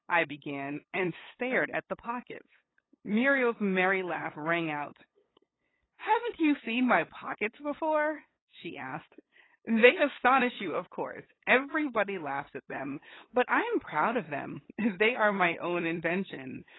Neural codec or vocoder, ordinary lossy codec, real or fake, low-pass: codec, 16 kHz, 8 kbps, FunCodec, trained on LibriTTS, 25 frames a second; AAC, 16 kbps; fake; 7.2 kHz